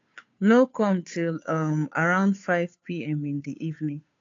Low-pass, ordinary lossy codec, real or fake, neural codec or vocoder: 7.2 kHz; AAC, 48 kbps; fake; codec, 16 kHz, 2 kbps, FunCodec, trained on Chinese and English, 25 frames a second